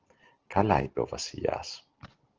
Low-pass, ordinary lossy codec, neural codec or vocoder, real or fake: 7.2 kHz; Opus, 16 kbps; none; real